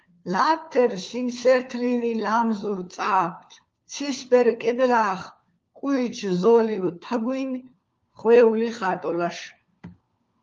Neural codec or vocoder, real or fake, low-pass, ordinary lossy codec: codec, 16 kHz, 4 kbps, FunCodec, trained on LibriTTS, 50 frames a second; fake; 7.2 kHz; Opus, 32 kbps